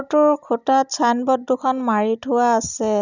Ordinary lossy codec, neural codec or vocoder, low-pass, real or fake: none; none; 7.2 kHz; real